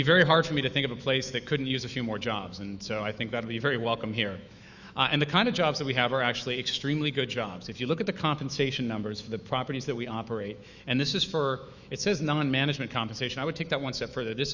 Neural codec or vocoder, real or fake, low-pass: autoencoder, 48 kHz, 128 numbers a frame, DAC-VAE, trained on Japanese speech; fake; 7.2 kHz